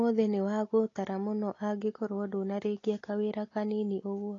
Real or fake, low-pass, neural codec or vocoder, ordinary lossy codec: real; 7.2 kHz; none; AAC, 32 kbps